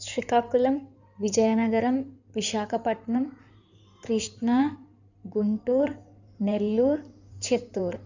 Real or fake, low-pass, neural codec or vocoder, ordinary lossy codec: fake; 7.2 kHz; codec, 16 kHz in and 24 kHz out, 2.2 kbps, FireRedTTS-2 codec; none